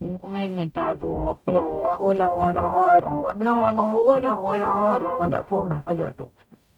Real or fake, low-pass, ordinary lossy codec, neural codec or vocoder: fake; 19.8 kHz; none; codec, 44.1 kHz, 0.9 kbps, DAC